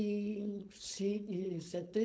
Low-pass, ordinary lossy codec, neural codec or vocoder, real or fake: none; none; codec, 16 kHz, 4.8 kbps, FACodec; fake